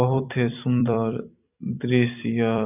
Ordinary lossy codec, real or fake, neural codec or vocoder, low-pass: none; fake; vocoder, 44.1 kHz, 128 mel bands every 256 samples, BigVGAN v2; 3.6 kHz